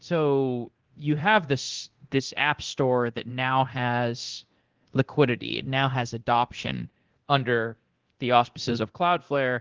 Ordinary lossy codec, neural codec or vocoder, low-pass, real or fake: Opus, 16 kbps; codec, 24 kHz, 0.9 kbps, DualCodec; 7.2 kHz; fake